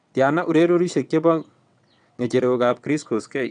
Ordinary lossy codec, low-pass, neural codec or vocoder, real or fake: none; 9.9 kHz; vocoder, 22.05 kHz, 80 mel bands, WaveNeXt; fake